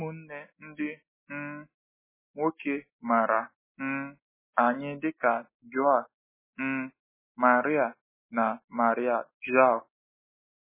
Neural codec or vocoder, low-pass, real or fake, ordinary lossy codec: none; 3.6 kHz; real; MP3, 16 kbps